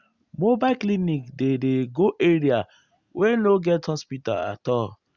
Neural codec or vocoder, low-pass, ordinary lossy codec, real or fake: none; 7.2 kHz; Opus, 64 kbps; real